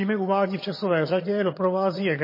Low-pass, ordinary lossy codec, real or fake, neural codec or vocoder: 5.4 kHz; MP3, 24 kbps; fake; vocoder, 22.05 kHz, 80 mel bands, HiFi-GAN